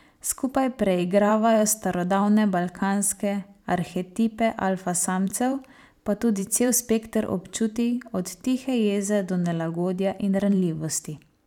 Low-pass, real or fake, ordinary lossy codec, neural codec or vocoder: 19.8 kHz; fake; none; vocoder, 44.1 kHz, 128 mel bands every 512 samples, BigVGAN v2